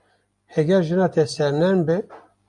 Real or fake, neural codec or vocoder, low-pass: real; none; 10.8 kHz